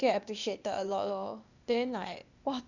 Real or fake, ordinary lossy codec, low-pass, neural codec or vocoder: fake; none; 7.2 kHz; codec, 16 kHz, 0.8 kbps, ZipCodec